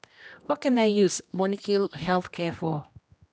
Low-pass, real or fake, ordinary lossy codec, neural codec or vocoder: none; fake; none; codec, 16 kHz, 1 kbps, X-Codec, HuBERT features, trained on general audio